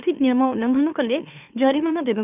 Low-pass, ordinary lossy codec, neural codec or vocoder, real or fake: 3.6 kHz; none; autoencoder, 44.1 kHz, a latent of 192 numbers a frame, MeloTTS; fake